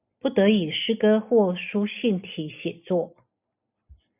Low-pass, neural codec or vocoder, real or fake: 3.6 kHz; none; real